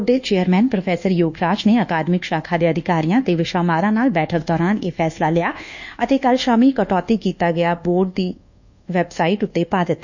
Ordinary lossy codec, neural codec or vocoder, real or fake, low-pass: none; codec, 24 kHz, 1.2 kbps, DualCodec; fake; 7.2 kHz